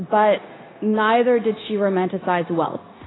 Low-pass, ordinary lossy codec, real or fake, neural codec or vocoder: 7.2 kHz; AAC, 16 kbps; fake; codec, 16 kHz, 0.9 kbps, LongCat-Audio-Codec